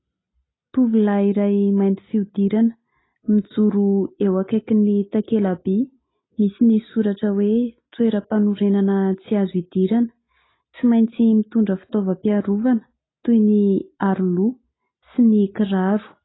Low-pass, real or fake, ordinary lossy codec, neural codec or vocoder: 7.2 kHz; real; AAC, 16 kbps; none